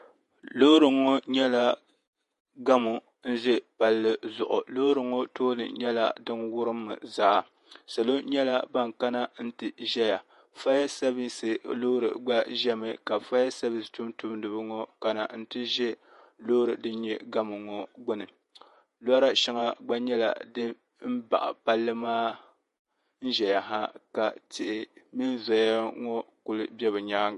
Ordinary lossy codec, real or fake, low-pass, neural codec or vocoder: MP3, 48 kbps; fake; 14.4 kHz; vocoder, 48 kHz, 128 mel bands, Vocos